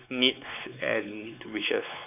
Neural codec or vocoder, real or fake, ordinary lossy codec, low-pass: codec, 16 kHz, 4 kbps, X-Codec, WavLM features, trained on Multilingual LibriSpeech; fake; none; 3.6 kHz